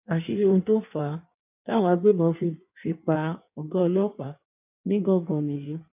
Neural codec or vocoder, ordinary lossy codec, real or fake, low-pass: codec, 16 kHz in and 24 kHz out, 1.1 kbps, FireRedTTS-2 codec; none; fake; 3.6 kHz